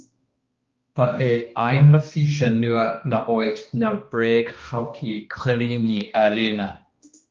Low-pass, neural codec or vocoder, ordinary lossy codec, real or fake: 7.2 kHz; codec, 16 kHz, 1 kbps, X-Codec, HuBERT features, trained on balanced general audio; Opus, 32 kbps; fake